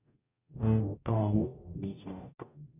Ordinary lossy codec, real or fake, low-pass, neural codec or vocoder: none; fake; 3.6 kHz; codec, 44.1 kHz, 0.9 kbps, DAC